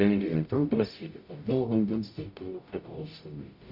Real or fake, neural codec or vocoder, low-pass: fake; codec, 44.1 kHz, 0.9 kbps, DAC; 5.4 kHz